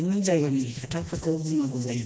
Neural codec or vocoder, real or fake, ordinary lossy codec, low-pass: codec, 16 kHz, 1 kbps, FreqCodec, smaller model; fake; none; none